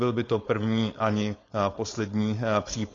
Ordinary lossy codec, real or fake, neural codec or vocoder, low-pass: AAC, 32 kbps; fake; codec, 16 kHz, 4.8 kbps, FACodec; 7.2 kHz